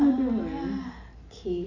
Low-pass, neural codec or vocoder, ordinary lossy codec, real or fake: 7.2 kHz; none; none; real